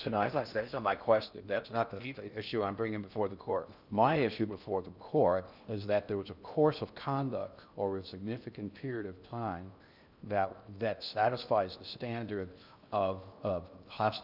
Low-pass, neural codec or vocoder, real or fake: 5.4 kHz; codec, 16 kHz in and 24 kHz out, 0.6 kbps, FocalCodec, streaming, 4096 codes; fake